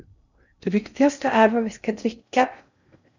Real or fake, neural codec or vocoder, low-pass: fake; codec, 16 kHz in and 24 kHz out, 0.6 kbps, FocalCodec, streaming, 2048 codes; 7.2 kHz